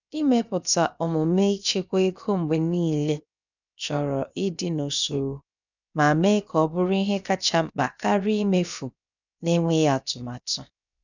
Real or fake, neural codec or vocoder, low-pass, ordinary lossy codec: fake; codec, 16 kHz, 0.7 kbps, FocalCodec; 7.2 kHz; none